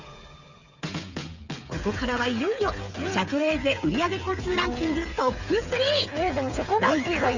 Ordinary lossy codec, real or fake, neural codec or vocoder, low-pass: Opus, 64 kbps; fake; codec, 16 kHz, 8 kbps, FreqCodec, smaller model; 7.2 kHz